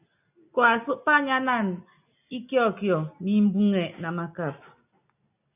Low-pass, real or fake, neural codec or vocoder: 3.6 kHz; real; none